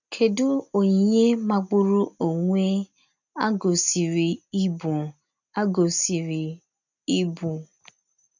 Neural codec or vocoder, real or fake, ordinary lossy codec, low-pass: none; real; none; 7.2 kHz